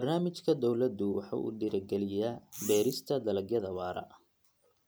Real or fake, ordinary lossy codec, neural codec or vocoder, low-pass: real; none; none; none